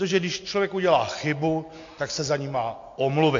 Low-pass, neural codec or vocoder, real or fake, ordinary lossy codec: 7.2 kHz; none; real; AAC, 48 kbps